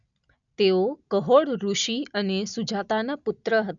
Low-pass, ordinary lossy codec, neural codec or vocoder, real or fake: 7.2 kHz; none; none; real